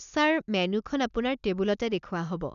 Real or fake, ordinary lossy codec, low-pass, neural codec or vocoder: real; none; 7.2 kHz; none